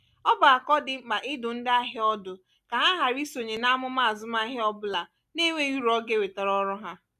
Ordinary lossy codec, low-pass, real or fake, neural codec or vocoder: none; 14.4 kHz; real; none